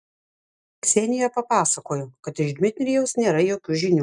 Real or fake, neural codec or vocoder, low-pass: real; none; 10.8 kHz